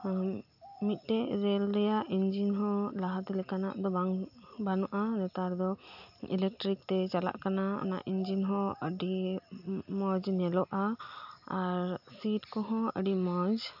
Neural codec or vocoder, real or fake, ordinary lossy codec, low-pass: none; real; AAC, 48 kbps; 5.4 kHz